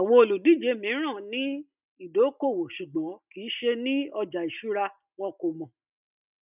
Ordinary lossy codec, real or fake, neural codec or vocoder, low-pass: none; real; none; 3.6 kHz